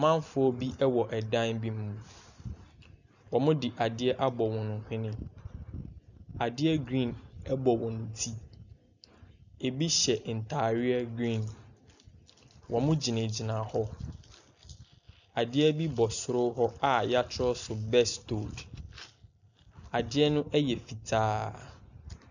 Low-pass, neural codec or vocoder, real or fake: 7.2 kHz; none; real